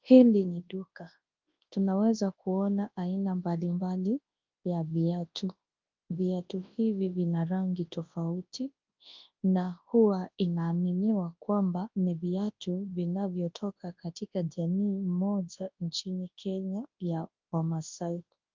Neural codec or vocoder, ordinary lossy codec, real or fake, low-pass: codec, 24 kHz, 0.9 kbps, WavTokenizer, large speech release; Opus, 24 kbps; fake; 7.2 kHz